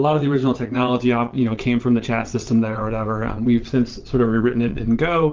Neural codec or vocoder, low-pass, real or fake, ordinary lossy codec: vocoder, 22.05 kHz, 80 mel bands, WaveNeXt; 7.2 kHz; fake; Opus, 24 kbps